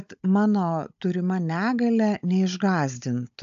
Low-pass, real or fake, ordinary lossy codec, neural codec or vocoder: 7.2 kHz; fake; AAC, 96 kbps; codec, 16 kHz, 16 kbps, FunCodec, trained on Chinese and English, 50 frames a second